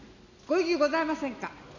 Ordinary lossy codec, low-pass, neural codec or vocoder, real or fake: none; 7.2 kHz; none; real